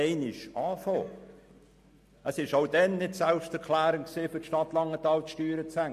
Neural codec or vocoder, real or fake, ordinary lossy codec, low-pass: none; real; MP3, 64 kbps; 14.4 kHz